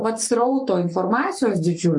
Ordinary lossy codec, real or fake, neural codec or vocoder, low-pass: MP3, 64 kbps; fake; codec, 44.1 kHz, 7.8 kbps, Pupu-Codec; 10.8 kHz